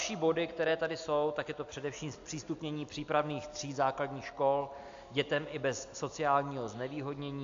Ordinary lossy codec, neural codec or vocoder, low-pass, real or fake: AAC, 64 kbps; none; 7.2 kHz; real